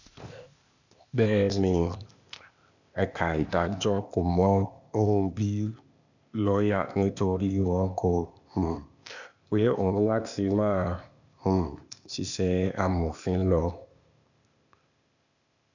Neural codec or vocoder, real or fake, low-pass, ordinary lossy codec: codec, 16 kHz, 0.8 kbps, ZipCodec; fake; 7.2 kHz; none